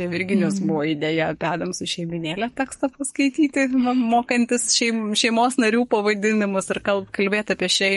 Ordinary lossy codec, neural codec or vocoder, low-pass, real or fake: MP3, 48 kbps; codec, 44.1 kHz, 7.8 kbps, DAC; 19.8 kHz; fake